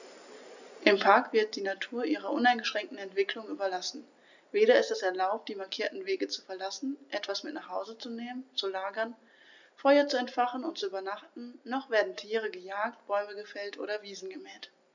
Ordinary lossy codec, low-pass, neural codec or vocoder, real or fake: none; 7.2 kHz; none; real